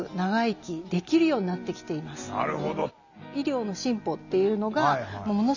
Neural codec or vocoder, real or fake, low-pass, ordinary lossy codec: none; real; 7.2 kHz; none